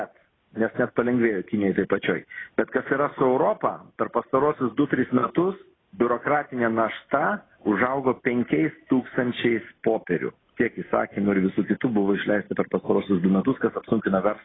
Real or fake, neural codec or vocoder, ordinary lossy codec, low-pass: real; none; AAC, 16 kbps; 7.2 kHz